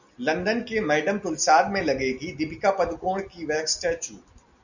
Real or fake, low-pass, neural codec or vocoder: real; 7.2 kHz; none